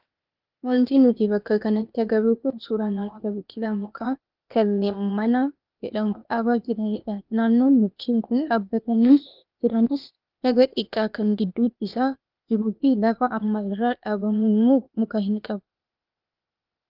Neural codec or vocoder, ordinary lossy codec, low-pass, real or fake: codec, 16 kHz, 0.8 kbps, ZipCodec; Opus, 24 kbps; 5.4 kHz; fake